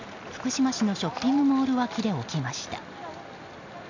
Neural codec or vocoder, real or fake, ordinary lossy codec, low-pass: none; real; none; 7.2 kHz